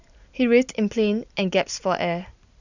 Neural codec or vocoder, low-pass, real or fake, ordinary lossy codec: none; 7.2 kHz; real; none